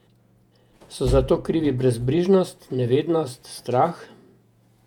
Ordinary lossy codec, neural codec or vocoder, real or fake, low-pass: none; vocoder, 48 kHz, 128 mel bands, Vocos; fake; 19.8 kHz